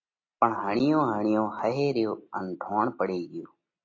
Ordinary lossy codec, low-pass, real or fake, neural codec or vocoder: AAC, 32 kbps; 7.2 kHz; real; none